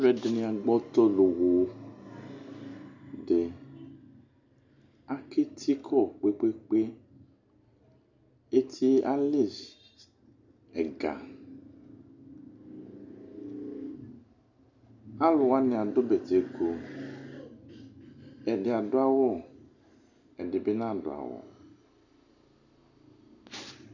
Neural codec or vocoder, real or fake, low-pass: none; real; 7.2 kHz